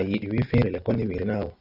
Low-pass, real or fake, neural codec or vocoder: 5.4 kHz; real; none